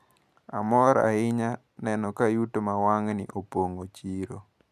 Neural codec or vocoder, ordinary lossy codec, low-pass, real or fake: none; none; 14.4 kHz; real